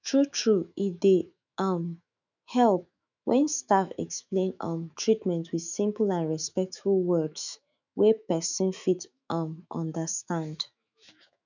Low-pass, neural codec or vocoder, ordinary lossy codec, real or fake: 7.2 kHz; autoencoder, 48 kHz, 128 numbers a frame, DAC-VAE, trained on Japanese speech; none; fake